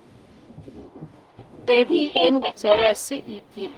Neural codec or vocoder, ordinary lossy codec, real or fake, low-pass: codec, 44.1 kHz, 0.9 kbps, DAC; Opus, 32 kbps; fake; 19.8 kHz